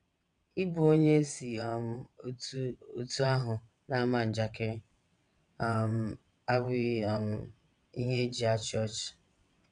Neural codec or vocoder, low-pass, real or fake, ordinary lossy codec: vocoder, 22.05 kHz, 80 mel bands, WaveNeXt; 9.9 kHz; fake; none